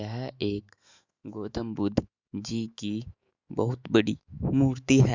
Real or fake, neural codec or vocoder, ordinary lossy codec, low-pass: fake; autoencoder, 48 kHz, 128 numbers a frame, DAC-VAE, trained on Japanese speech; Opus, 64 kbps; 7.2 kHz